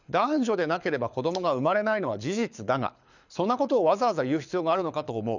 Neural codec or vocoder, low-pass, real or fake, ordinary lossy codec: codec, 24 kHz, 6 kbps, HILCodec; 7.2 kHz; fake; none